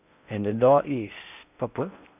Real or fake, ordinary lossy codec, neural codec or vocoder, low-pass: fake; none; codec, 16 kHz in and 24 kHz out, 0.6 kbps, FocalCodec, streaming, 4096 codes; 3.6 kHz